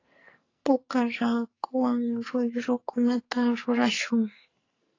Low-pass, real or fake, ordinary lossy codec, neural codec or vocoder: 7.2 kHz; fake; AAC, 32 kbps; codec, 44.1 kHz, 2.6 kbps, SNAC